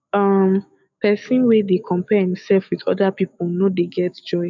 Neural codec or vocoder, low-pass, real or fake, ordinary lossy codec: autoencoder, 48 kHz, 128 numbers a frame, DAC-VAE, trained on Japanese speech; 7.2 kHz; fake; none